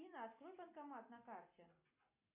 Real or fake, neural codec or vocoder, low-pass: real; none; 3.6 kHz